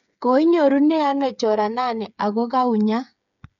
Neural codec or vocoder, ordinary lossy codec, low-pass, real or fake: codec, 16 kHz, 8 kbps, FreqCodec, smaller model; none; 7.2 kHz; fake